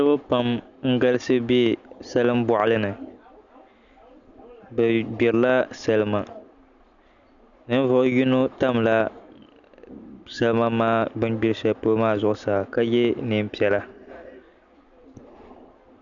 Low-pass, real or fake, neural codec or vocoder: 7.2 kHz; real; none